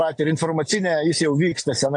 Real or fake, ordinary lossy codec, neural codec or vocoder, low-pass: real; AAC, 64 kbps; none; 9.9 kHz